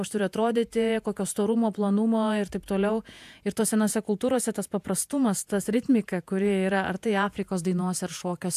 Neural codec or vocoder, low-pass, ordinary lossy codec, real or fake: vocoder, 48 kHz, 128 mel bands, Vocos; 14.4 kHz; AAC, 96 kbps; fake